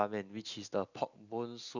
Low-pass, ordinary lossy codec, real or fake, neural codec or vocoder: 7.2 kHz; none; real; none